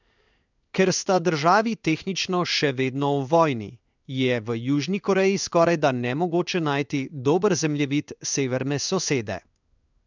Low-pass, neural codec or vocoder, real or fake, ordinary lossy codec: 7.2 kHz; codec, 16 kHz in and 24 kHz out, 1 kbps, XY-Tokenizer; fake; none